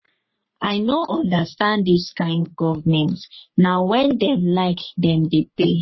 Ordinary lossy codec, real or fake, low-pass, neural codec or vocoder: MP3, 24 kbps; fake; 7.2 kHz; codec, 44.1 kHz, 3.4 kbps, Pupu-Codec